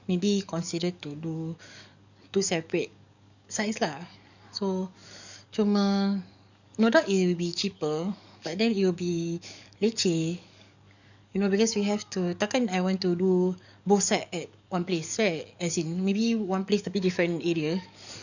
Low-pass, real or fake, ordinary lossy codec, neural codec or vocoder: 7.2 kHz; fake; none; codec, 44.1 kHz, 7.8 kbps, DAC